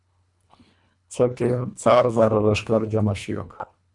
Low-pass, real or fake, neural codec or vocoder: 10.8 kHz; fake; codec, 24 kHz, 1.5 kbps, HILCodec